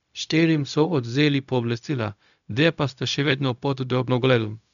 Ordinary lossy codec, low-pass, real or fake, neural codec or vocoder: none; 7.2 kHz; fake; codec, 16 kHz, 0.4 kbps, LongCat-Audio-Codec